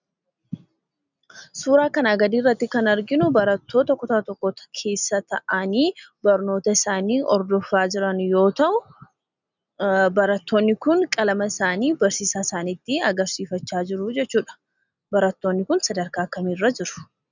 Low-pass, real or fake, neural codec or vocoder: 7.2 kHz; real; none